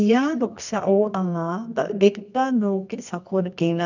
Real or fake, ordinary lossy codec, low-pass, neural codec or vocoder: fake; none; 7.2 kHz; codec, 24 kHz, 0.9 kbps, WavTokenizer, medium music audio release